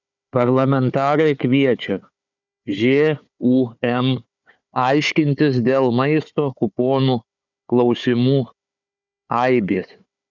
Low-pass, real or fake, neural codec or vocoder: 7.2 kHz; fake; codec, 16 kHz, 4 kbps, FunCodec, trained on Chinese and English, 50 frames a second